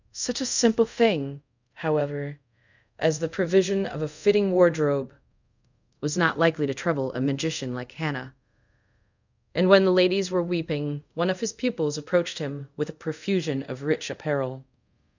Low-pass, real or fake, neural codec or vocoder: 7.2 kHz; fake; codec, 24 kHz, 0.5 kbps, DualCodec